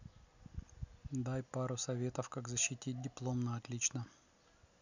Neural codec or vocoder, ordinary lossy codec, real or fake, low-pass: none; none; real; 7.2 kHz